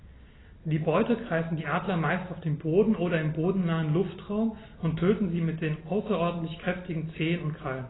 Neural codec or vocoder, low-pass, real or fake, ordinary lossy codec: none; 7.2 kHz; real; AAC, 16 kbps